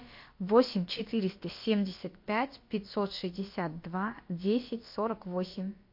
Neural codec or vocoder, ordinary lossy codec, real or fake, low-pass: codec, 16 kHz, about 1 kbps, DyCAST, with the encoder's durations; MP3, 32 kbps; fake; 5.4 kHz